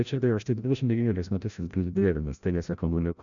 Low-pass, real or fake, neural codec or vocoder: 7.2 kHz; fake; codec, 16 kHz, 0.5 kbps, FreqCodec, larger model